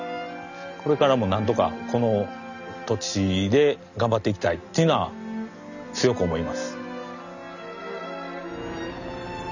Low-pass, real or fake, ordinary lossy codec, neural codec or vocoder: 7.2 kHz; real; none; none